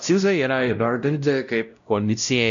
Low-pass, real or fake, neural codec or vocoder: 7.2 kHz; fake; codec, 16 kHz, 0.5 kbps, X-Codec, WavLM features, trained on Multilingual LibriSpeech